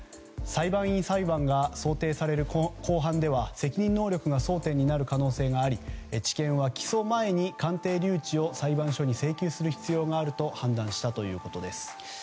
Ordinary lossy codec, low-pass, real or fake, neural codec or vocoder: none; none; real; none